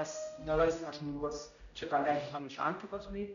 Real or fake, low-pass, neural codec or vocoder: fake; 7.2 kHz; codec, 16 kHz, 0.5 kbps, X-Codec, HuBERT features, trained on general audio